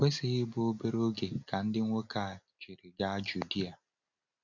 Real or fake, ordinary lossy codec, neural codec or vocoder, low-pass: real; none; none; 7.2 kHz